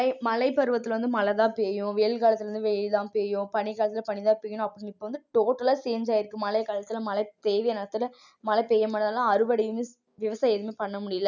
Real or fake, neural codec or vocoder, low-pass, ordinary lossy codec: real; none; 7.2 kHz; none